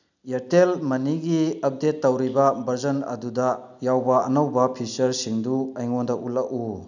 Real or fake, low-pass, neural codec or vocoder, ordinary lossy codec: real; 7.2 kHz; none; none